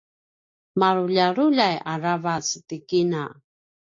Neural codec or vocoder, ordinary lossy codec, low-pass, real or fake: none; AAC, 48 kbps; 7.2 kHz; real